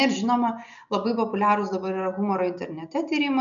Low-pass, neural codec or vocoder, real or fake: 7.2 kHz; none; real